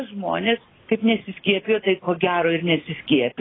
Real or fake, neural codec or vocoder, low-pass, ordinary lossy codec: real; none; 7.2 kHz; AAC, 16 kbps